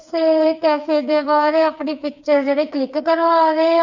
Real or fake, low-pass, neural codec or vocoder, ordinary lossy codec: fake; 7.2 kHz; codec, 16 kHz, 4 kbps, FreqCodec, smaller model; none